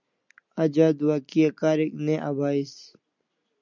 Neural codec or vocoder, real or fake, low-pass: none; real; 7.2 kHz